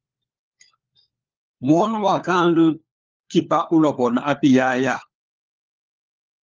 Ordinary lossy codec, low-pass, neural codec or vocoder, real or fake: Opus, 32 kbps; 7.2 kHz; codec, 16 kHz, 4 kbps, FunCodec, trained on LibriTTS, 50 frames a second; fake